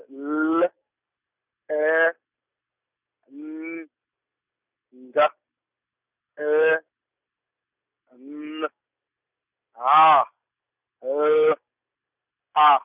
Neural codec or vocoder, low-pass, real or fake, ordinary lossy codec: none; 3.6 kHz; real; none